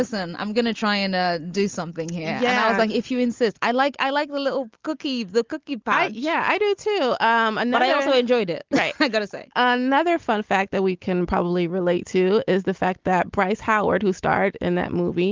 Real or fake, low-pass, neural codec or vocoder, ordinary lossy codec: real; 7.2 kHz; none; Opus, 32 kbps